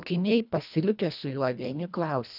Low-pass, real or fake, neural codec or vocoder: 5.4 kHz; fake; codec, 24 kHz, 1.5 kbps, HILCodec